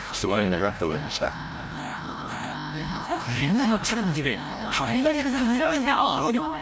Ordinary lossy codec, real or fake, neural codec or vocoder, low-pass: none; fake; codec, 16 kHz, 0.5 kbps, FreqCodec, larger model; none